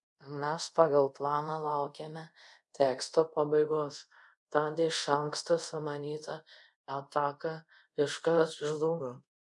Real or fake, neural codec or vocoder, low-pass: fake; codec, 24 kHz, 0.5 kbps, DualCodec; 10.8 kHz